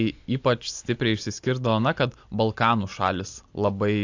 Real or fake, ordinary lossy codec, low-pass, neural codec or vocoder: real; AAC, 48 kbps; 7.2 kHz; none